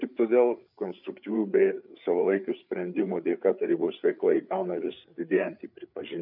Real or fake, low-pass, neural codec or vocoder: fake; 5.4 kHz; codec, 16 kHz, 8 kbps, FreqCodec, larger model